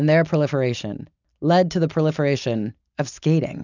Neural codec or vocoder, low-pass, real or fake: none; 7.2 kHz; real